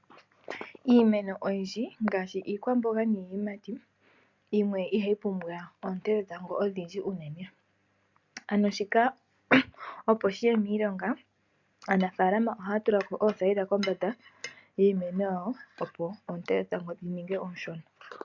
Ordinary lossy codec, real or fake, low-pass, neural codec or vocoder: MP3, 64 kbps; real; 7.2 kHz; none